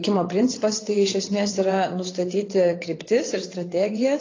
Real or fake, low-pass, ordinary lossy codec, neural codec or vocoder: fake; 7.2 kHz; AAC, 32 kbps; vocoder, 22.05 kHz, 80 mel bands, WaveNeXt